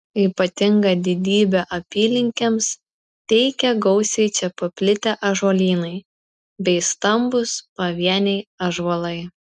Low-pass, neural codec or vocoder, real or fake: 10.8 kHz; none; real